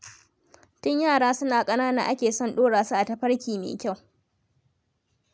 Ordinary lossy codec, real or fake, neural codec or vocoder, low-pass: none; real; none; none